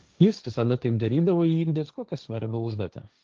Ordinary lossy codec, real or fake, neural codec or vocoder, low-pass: Opus, 24 kbps; fake; codec, 16 kHz, 1.1 kbps, Voila-Tokenizer; 7.2 kHz